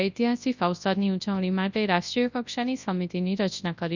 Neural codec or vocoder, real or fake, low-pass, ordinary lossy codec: codec, 24 kHz, 0.9 kbps, WavTokenizer, large speech release; fake; 7.2 kHz; none